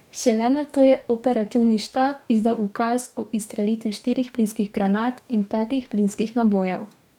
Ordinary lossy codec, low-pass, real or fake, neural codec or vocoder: none; 19.8 kHz; fake; codec, 44.1 kHz, 2.6 kbps, DAC